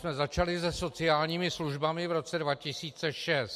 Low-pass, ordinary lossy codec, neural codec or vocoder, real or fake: 14.4 kHz; MP3, 64 kbps; none; real